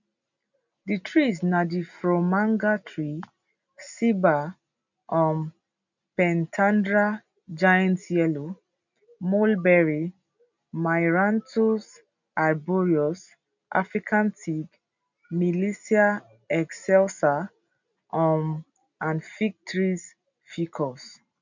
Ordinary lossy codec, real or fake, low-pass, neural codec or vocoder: none; real; 7.2 kHz; none